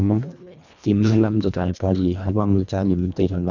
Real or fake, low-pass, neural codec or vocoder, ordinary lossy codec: fake; 7.2 kHz; codec, 24 kHz, 1.5 kbps, HILCodec; none